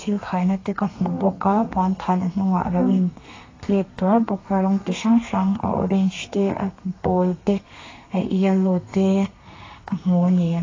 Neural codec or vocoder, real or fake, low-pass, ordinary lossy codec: codec, 32 kHz, 1.9 kbps, SNAC; fake; 7.2 kHz; AAC, 32 kbps